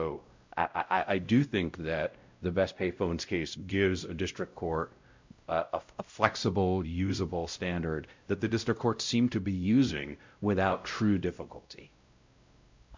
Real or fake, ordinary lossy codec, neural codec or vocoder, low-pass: fake; MP3, 64 kbps; codec, 16 kHz, 0.5 kbps, X-Codec, WavLM features, trained on Multilingual LibriSpeech; 7.2 kHz